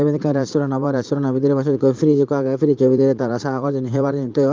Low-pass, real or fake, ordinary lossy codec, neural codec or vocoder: 7.2 kHz; fake; Opus, 32 kbps; vocoder, 44.1 kHz, 80 mel bands, Vocos